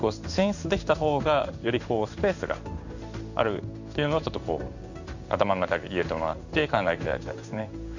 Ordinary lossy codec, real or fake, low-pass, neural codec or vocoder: none; fake; 7.2 kHz; codec, 16 kHz in and 24 kHz out, 1 kbps, XY-Tokenizer